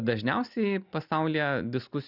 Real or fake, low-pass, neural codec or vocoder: real; 5.4 kHz; none